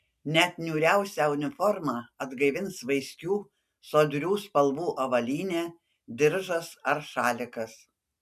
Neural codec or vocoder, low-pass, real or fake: none; 14.4 kHz; real